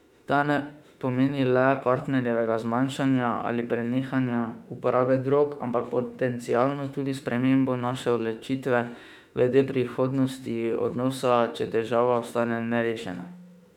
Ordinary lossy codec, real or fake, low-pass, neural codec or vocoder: none; fake; 19.8 kHz; autoencoder, 48 kHz, 32 numbers a frame, DAC-VAE, trained on Japanese speech